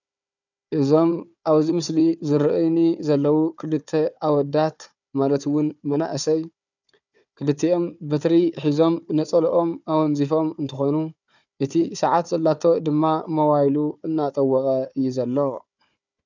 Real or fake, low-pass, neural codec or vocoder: fake; 7.2 kHz; codec, 16 kHz, 4 kbps, FunCodec, trained on Chinese and English, 50 frames a second